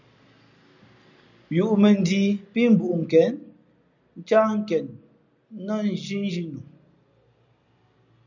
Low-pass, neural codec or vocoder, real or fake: 7.2 kHz; none; real